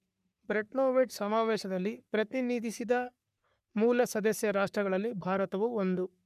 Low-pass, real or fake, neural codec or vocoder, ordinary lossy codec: 14.4 kHz; fake; codec, 44.1 kHz, 3.4 kbps, Pupu-Codec; none